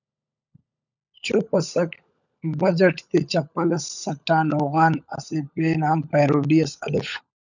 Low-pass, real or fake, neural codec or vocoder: 7.2 kHz; fake; codec, 16 kHz, 16 kbps, FunCodec, trained on LibriTTS, 50 frames a second